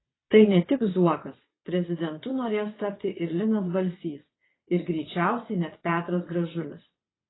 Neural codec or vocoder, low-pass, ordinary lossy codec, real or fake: vocoder, 22.05 kHz, 80 mel bands, WaveNeXt; 7.2 kHz; AAC, 16 kbps; fake